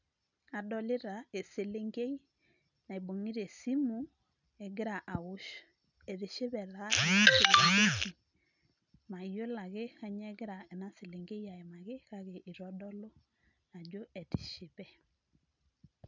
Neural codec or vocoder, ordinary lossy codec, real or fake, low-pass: none; none; real; 7.2 kHz